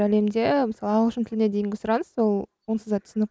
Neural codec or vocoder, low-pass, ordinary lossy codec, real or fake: none; none; none; real